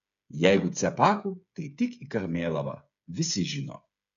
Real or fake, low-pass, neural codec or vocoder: fake; 7.2 kHz; codec, 16 kHz, 8 kbps, FreqCodec, smaller model